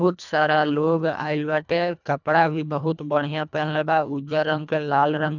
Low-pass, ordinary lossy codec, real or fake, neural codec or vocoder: 7.2 kHz; none; fake; codec, 24 kHz, 1.5 kbps, HILCodec